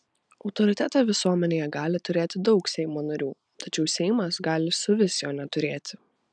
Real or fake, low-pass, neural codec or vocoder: real; 9.9 kHz; none